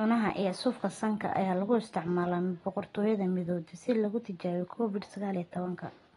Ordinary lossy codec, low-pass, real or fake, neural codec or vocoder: AAC, 32 kbps; 10.8 kHz; real; none